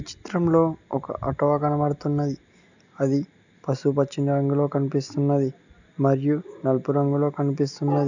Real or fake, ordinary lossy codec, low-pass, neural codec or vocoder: real; none; 7.2 kHz; none